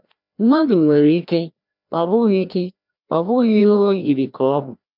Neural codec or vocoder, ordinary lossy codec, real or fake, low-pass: codec, 16 kHz, 1 kbps, FreqCodec, larger model; none; fake; 5.4 kHz